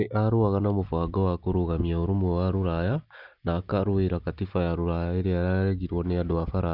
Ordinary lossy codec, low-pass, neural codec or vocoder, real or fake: Opus, 24 kbps; 5.4 kHz; none; real